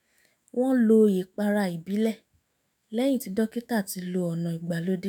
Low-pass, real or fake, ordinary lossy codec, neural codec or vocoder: none; fake; none; autoencoder, 48 kHz, 128 numbers a frame, DAC-VAE, trained on Japanese speech